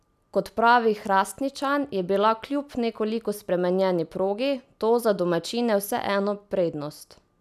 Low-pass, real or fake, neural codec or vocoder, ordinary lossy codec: 14.4 kHz; real; none; none